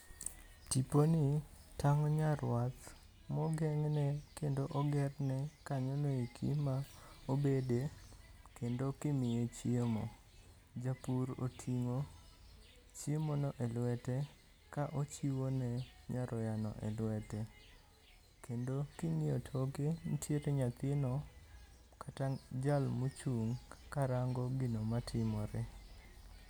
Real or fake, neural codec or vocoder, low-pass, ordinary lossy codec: real; none; none; none